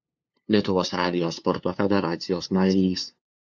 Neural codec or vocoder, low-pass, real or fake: codec, 16 kHz, 2 kbps, FunCodec, trained on LibriTTS, 25 frames a second; 7.2 kHz; fake